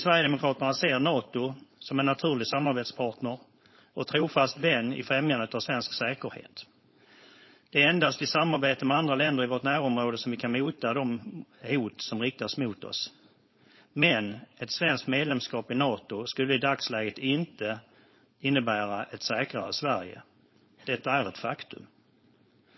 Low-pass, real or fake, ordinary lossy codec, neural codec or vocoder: 7.2 kHz; fake; MP3, 24 kbps; codec, 16 kHz, 4.8 kbps, FACodec